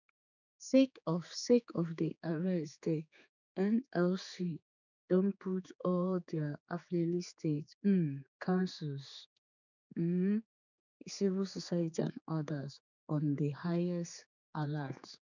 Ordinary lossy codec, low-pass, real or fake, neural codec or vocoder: none; 7.2 kHz; fake; codec, 16 kHz, 4 kbps, X-Codec, HuBERT features, trained on general audio